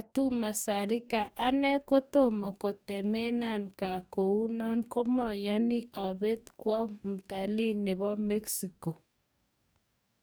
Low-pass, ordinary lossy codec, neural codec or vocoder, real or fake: none; none; codec, 44.1 kHz, 2.6 kbps, DAC; fake